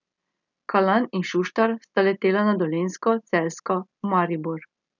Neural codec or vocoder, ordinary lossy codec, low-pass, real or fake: none; none; 7.2 kHz; real